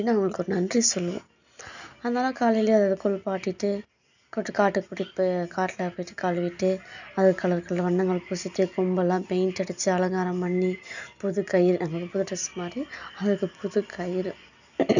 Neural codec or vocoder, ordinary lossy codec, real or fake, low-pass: none; none; real; 7.2 kHz